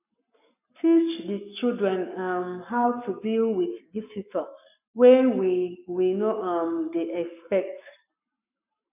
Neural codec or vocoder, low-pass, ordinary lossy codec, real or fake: codec, 44.1 kHz, 7.8 kbps, Pupu-Codec; 3.6 kHz; none; fake